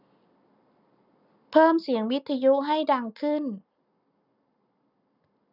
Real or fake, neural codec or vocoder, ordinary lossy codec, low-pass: real; none; none; 5.4 kHz